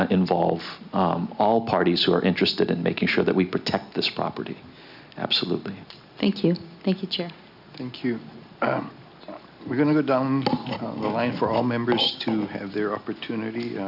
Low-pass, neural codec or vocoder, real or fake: 5.4 kHz; none; real